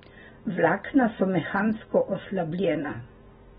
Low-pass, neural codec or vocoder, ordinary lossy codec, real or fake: 10.8 kHz; none; AAC, 16 kbps; real